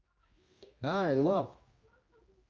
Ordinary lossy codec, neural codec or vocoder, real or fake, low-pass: Opus, 32 kbps; codec, 16 kHz, 1 kbps, X-Codec, HuBERT features, trained on general audio; fake; 7.2 kHz